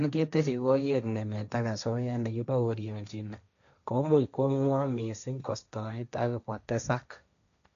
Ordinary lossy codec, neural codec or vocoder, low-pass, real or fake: none; codec, 16 kHz, 1.1 kbps, Voila-Tokenizer; 7.2 kHz; fake